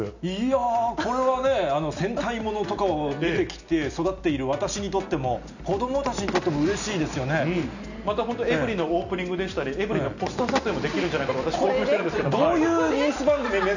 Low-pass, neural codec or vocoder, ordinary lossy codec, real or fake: 7.2 kHz; none; none; real